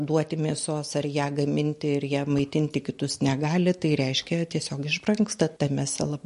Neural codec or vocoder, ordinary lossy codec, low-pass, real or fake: none; MP3, 48 kbps; 14.4 kHz; real